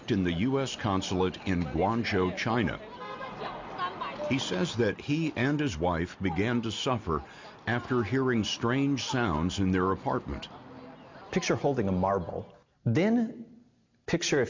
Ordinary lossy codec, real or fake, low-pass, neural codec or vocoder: MP3, 64 kbps; real; 7.2 kHz; none